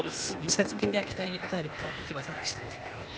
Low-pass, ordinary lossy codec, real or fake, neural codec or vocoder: none; none; fake; codec, 16 kHz, 0.8 kbps, ZipCodec